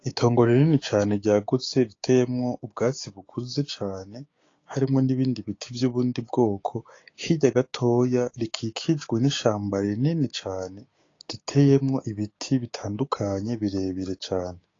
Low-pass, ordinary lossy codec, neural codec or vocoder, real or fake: 7.2 kHz; AAC, 32 kbps; codec, 16 kHz, 6 kbps, DAC; fake